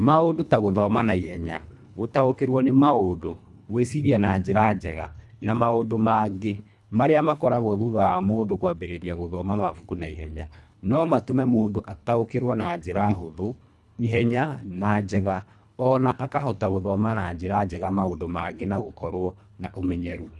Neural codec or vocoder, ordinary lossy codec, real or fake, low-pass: codec, 24 kHz, 1.5 kbps, HILCodec; none; fake; none